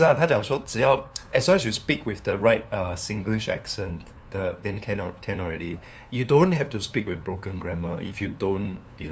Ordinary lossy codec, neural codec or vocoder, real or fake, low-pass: none; codec, 16 kHz, 2 kbps, FunCodec, trained on LibriTTS, 25 frames a second; fake; none